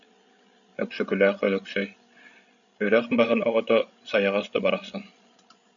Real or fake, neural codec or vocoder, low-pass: fake; codec, 16 kHz, 16 kbps, FreqCodec, larger model; 7.2 kHz